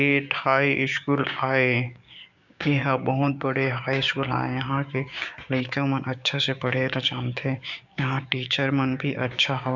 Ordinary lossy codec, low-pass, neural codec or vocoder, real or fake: none; 7.2 kHz; codec, 16 kHz, 6 kbps, DAC; fake